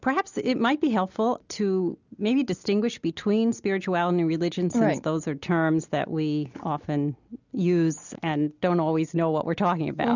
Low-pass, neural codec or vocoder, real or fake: 7.2 kHz; none; real